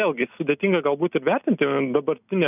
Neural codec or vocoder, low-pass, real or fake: none; 3.6 kHz; real